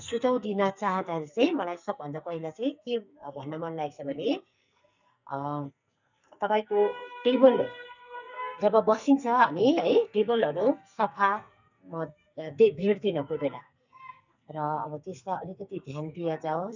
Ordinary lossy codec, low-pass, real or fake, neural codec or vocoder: none; 7.2 kHz; fake; codec, 44.1 kHz, 2.6 kbps, SNAC